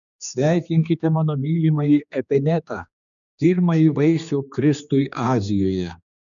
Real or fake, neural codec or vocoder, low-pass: fake; codec, 16 kHz, 2 kbps, X-Codec, HuBERT features, trained on general audio; 7.2 kHz